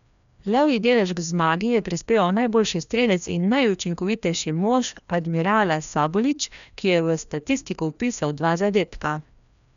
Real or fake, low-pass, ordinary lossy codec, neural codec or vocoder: fake; 7.2 kHz; none; codec, 16 kHz, 1 kbps, FreqCodec, larger model